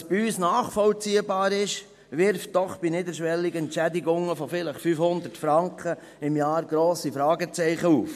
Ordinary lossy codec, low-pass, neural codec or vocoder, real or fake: MP3, 64 kbps; 14.4 kHz; none; real